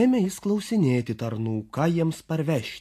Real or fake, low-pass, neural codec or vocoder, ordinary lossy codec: real; 14.4 kHz; none; AAC, 64 kbps